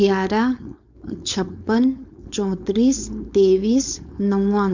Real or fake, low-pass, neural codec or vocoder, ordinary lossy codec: fake; 7.2 kHz; codec, 16 kHz, 4.8 kbps, FACodec; none